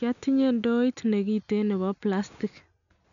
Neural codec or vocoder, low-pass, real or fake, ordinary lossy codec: none; 7.2 kHz; real; none